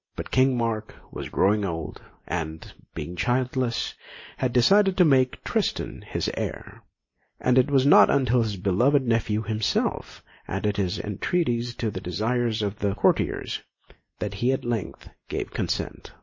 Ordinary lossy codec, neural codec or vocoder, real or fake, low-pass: MP3, 32 kbps; none; real; 7.2 kHz